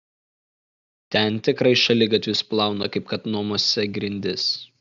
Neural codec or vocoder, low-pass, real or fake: none; 7.2 kHz; real